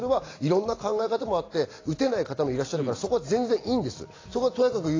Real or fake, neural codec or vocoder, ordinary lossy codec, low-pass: real; none; AAC, 32 kbps; 7.2 kHz